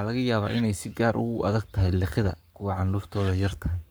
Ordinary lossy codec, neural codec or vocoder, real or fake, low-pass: none; codec, 44.1 kHz, 7.8 kbps, Pupu-Codec; fake; none